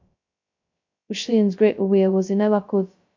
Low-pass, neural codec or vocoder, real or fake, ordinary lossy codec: 7.2 kHz; codec, 16 kHz, 0.2 kbps, FocalCodec; fake; MP3, 64 kbps